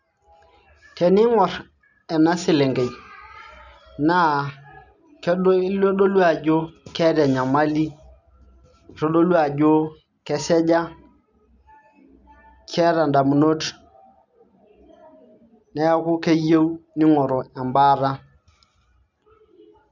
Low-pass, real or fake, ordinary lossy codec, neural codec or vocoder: 7.2 kHz; real; none; none